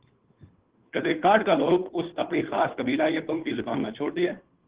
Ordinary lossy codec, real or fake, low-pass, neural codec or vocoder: Opus, 16 kbps; fake; 3.6 kHz; codec, 16 kHz, 2 kbps, FunCodec, trained on Chinese and English, 25 frames a second